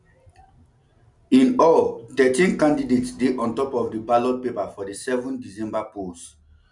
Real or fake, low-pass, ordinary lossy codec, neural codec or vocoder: real; 10.8 kHz; none; none